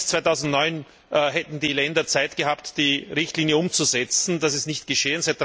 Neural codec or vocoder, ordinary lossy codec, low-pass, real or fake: none; none; none; real